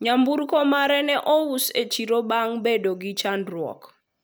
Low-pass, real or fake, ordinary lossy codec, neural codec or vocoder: none; real; none; none